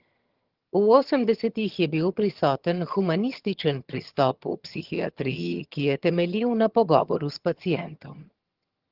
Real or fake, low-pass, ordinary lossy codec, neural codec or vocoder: fake; 5.4 kHz; Opus, 16 kbps; vocoder, 22.05 kHz, 80 mel bands, HiFi-GAN